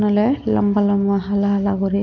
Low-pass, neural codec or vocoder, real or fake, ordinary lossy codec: 7.2 kHz; none; real; none